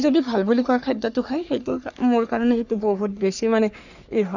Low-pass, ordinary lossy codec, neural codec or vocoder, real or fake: 7.2 kHz; none; codec, 44.1 kHz, 3.4 kbps, Pupu-Codec; fake